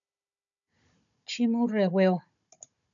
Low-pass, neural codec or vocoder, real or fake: 7.2 kHz; codec, 16 kHz, 16 kbps, FunCodec, trained on Chinese and English, 50 frames a second; fake